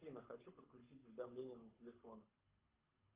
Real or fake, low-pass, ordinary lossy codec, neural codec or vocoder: fake; 3.6 kHz; Opus, 24 kbps; codec, 24 kHz, 6 kbps, HILCodec